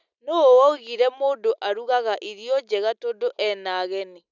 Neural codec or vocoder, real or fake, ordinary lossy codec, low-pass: none; real; none; 7.2 kHz